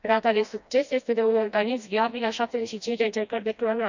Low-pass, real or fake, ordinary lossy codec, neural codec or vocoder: 7.2 kHz; fake; none; codec, 16 kHz, 1 kbps, FreqCodec, smaller model